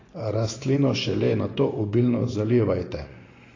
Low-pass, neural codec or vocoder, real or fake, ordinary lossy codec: 7.2 kHz; vocoder, 24 kHz, 100 mel bands, Vocos; fake; AAC, 32 kbps